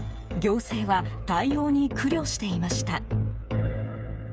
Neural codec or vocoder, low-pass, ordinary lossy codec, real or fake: codec, 16 kHz, 8 kbps, FreqCodec, smaller model; none; none; fake